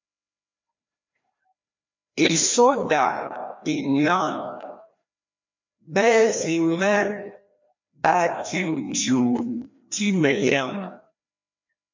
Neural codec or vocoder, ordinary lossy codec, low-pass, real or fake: codec, 16 kHz, 1 kbps, FreqCodec, larger model; MP3, 48 kbps; 7.2 kHz; fake